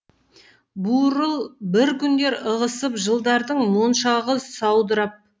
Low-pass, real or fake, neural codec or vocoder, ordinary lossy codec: none; real; none; none